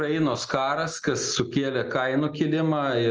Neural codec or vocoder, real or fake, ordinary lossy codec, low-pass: none; real; Opus, 24 kbps; 7.2 kHz